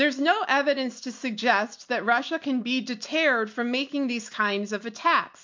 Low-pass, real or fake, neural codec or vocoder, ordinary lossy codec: 7.2 kHz; real; none; MP3, 48 kbps